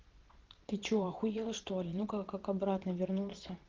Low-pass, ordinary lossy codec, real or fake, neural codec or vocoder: 7.2 kHz; Opus, 16 kbps; fake; vocoder, 22.05 kHz, 80 mel bands, Vocos